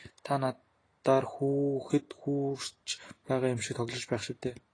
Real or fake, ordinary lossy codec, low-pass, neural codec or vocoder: real; AAC, 32 kbps; 9.9 kHz; none